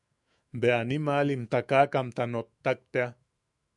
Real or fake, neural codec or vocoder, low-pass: fake; autoencoder, 48 kHz, 128 numbers a frame, DAC-VAE, trained on Japanese speech; 10.8 kHz